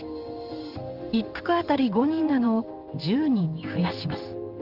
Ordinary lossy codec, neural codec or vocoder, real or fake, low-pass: Opus, 24 kbps; codec, 16 kHz in and 24 kHz out, 1 kbps, XY-Tokenizer; fake; 5.4 kHz